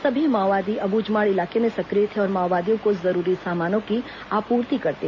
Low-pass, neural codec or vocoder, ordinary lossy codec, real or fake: 7.2 kHz; none; MP3, 32 kbps; real